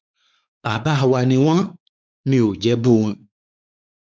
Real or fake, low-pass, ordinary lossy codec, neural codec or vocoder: fake; none; none; codec, 16 kHz, 4 kbps, X-Codec, WavLM features, trained on Multilingual LibriSpeech